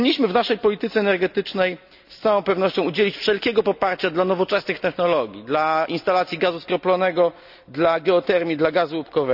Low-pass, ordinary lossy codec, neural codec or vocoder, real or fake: 5.4 kHz; none; none; real